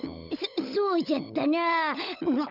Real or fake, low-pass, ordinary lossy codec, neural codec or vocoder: fake; 5.4 kHz; none; codec, 16 kHz, 16 kbps, FunCodec, trained on LibriTTS, 50 frames a second